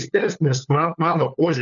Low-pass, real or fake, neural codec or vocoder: 7.2 kHz; fake; codec, 16 kHz, 4 kbps, FunCodec, trained on LibriTTS, 50 frames a second